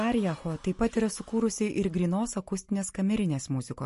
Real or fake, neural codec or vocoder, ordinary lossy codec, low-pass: real; none; MP3, 48 kbps; 14.4 kHz